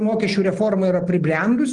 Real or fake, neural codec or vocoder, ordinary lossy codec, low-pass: real; none; Opus, 24 kbps; 10.8 kHz